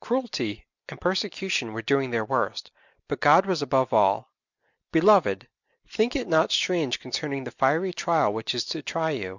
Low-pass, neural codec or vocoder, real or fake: 7.2 kHz; none; real